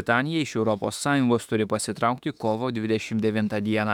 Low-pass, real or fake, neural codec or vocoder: 19.8 kHz; fake; autoencoder, 48 kHz, 32 numbers a frame, DAC-VAE, trained on Japanese speech